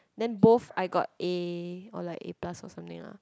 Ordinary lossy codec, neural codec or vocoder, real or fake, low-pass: none; none; real; none